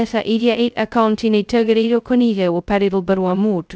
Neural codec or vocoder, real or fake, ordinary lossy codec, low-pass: codec, 16 kHz, 0.2 kbps, FocalCodec; fake; none; none